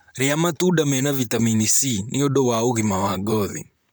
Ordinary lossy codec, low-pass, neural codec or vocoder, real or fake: none; none; vocoder, 44.1 kHz, 128 mel bands, Pupu-Vocoder; fake